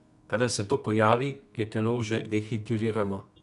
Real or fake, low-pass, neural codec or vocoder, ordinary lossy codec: fake; 10.8 kHz; codec, 24 kHz, 0.9 kbps, WavTokenizer, medium music audio release; none